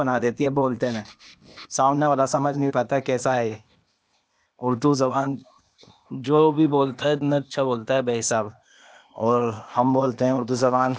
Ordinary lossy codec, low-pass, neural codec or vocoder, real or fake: none; none; codec, 16 kHz, 0.8 kbps, ZipCodec; fake